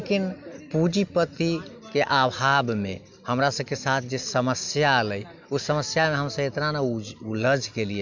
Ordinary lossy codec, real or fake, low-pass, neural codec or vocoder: MP3, 64 kbps; real; 7.2 kHz; none